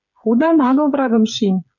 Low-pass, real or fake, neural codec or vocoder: 7.2 kHz; fake; codec, 16 kHz, 16 kbps, FreqCodec, smaller model